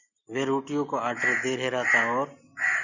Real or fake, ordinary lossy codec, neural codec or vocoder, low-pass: real; Opus, 64 kbps; none; 7.2 kHz